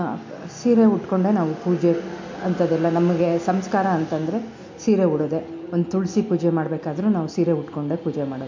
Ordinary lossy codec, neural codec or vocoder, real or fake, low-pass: MP3, 48 kbps; none; real; 7.2 kHz